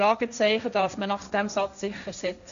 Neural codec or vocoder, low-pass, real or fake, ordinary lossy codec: codec, 16 kHz, 1.1 kbps, Voila-Tokenizer; 7.2 kHz; fake; none